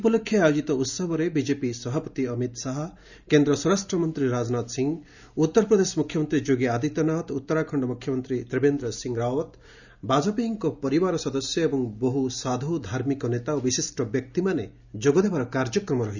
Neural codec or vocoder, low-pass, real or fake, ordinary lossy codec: none; 7.2 kHz; real; none